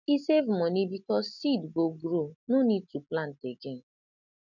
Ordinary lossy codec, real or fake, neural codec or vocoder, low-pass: none; real; none; 7.2 kHz